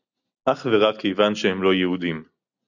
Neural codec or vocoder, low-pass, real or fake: none; 7.2 kHz; real